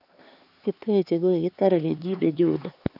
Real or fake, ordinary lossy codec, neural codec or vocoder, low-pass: fake; none; codec, 16 kHz, 4 kbps, X-Codec, HuBERT features, trained on LibriSpeech; 5.4 kHz